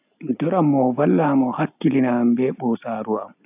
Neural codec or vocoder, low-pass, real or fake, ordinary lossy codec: none; 3.6 kHz; real; AAC, 32 kbps